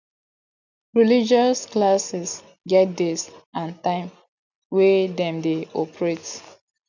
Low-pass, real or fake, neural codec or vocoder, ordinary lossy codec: 7.2 kHz; real; none; none